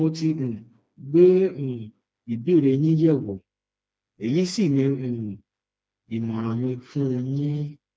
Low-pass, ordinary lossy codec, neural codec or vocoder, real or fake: none; none; codec, 16 kHz, 2 kbps, FreqCodec, smaller model; fake